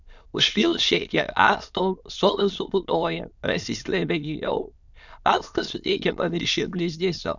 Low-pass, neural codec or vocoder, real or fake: 7.2 kHz; autoencoder, 22.05 kHz, a latent of 192 numbers a frame, VITS, trained on many speakers; fake